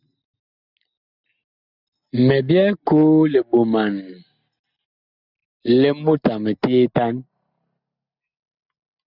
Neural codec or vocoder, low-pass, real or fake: none; 5.4 kHz; real